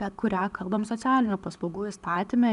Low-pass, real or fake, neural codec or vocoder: 10.8 kHz; real; none